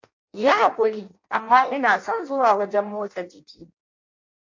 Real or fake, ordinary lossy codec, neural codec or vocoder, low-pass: fake; MP3, 48 kbps; codec, 16 kHz in and 24 kHz out, 0.6 kbps, FireRedTTS-2 codec; 7.2 kHz